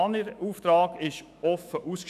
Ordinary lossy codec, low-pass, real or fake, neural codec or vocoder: none; 14.4 kHz; fake; autoencoder, 48 kHz, 128 numbers a frame, DAC-VAE, trained on Japanese speech